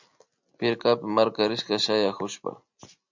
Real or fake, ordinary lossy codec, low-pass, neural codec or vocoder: real; MP3, 64 kbps; 7.2 kHz; none